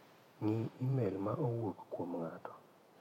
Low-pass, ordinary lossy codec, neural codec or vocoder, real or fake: 19.8 kHz; MP3, 64 kbps; vocoder, 48 kHz, 128 mel bands, Vocos; fake